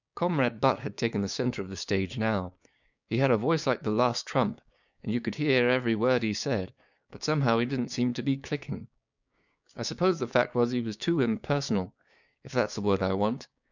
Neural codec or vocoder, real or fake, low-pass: codec, 16 kHz, 6 kbps, DAC; fake; 7.2 kHz